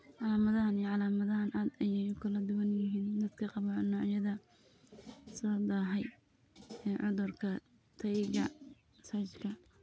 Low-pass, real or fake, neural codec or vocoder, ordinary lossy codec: none; real; none; none